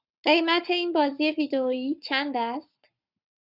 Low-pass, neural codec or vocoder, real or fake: 5.4 kHz; codec, 44.1 kHz, 7.8 kbps, Pupu-Codec; fake